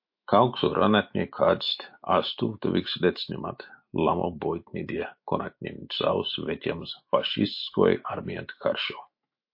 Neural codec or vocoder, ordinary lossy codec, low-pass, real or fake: autoencoder, 48 kHz, 128 numbers a frame, DAC-VAE, trained on Japanese speech; MP3, 32 kbps; 5.4 kHz; fake